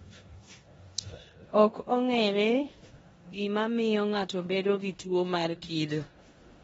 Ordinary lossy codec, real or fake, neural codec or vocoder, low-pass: AAC, 24 kbps; fake; codec, 16 kHz in and 24 kHz out, 0.9 kbps, LongCat-Audio-Codec, four codebook decoder; 10.8 kHz